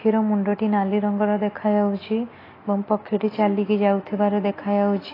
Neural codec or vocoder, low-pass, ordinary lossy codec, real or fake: none; 5.4 kHz; AAC, 24 kbps; real